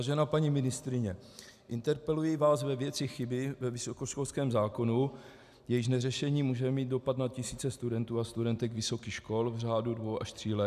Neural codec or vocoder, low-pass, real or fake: none; 14.4 kHz; real